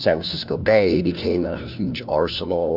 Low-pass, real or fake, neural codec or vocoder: 5.4 kHz; fake; codec, 16 kHz, 1 kbps, FunCodec, trained on LibriTTS, 50 frames a second